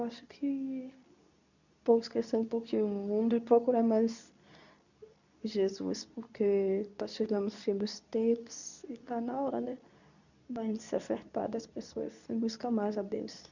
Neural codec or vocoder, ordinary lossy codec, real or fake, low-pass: codec, 24 kHz, 0.9 kbps, WavTokenizer, medium speech release version 2; none; fake; 7.2 kHz